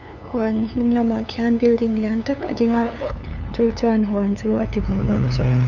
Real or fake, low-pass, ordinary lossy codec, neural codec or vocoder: fake; 7.2 kHz; none; codec, 16 kHz, 2 kbps, FunCodec, trained on LibriTTS, 25 frames a second